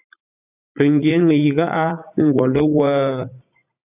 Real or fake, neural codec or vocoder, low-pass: fake; vocoder, 44.1 kHz, 80 mel bands, Vocos; 3.6 kHz